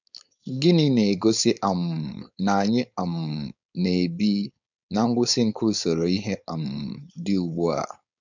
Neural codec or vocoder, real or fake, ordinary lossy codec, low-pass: codec, 16 kHz, 4.8 kbps, FACodec; fake; none; 7.2 kHz